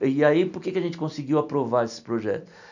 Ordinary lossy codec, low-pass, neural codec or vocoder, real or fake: none; 7.2 kHz; none; real